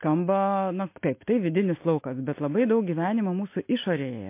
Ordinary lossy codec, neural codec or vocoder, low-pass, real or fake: MP3, 24 kbps; none; 3.6 kHz; real